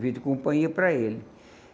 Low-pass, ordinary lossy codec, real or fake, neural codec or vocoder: none; none; real; none